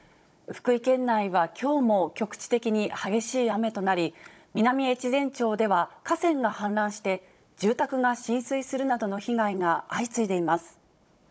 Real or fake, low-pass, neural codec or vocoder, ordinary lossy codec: fake; none; codec, 16 kHz, 16 kbps, FunCodec, trained on Chinese and English, 50 frames a second; none